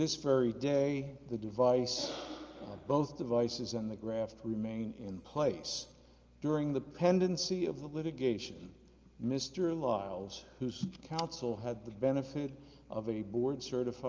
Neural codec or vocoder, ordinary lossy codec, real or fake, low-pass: none; Opus, 24 kbps; real; 7.2 kHz